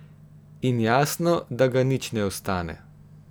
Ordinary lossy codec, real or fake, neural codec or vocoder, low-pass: none; real; none; none